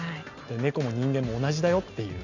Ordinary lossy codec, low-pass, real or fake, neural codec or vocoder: none; 7.2 kHz; real; none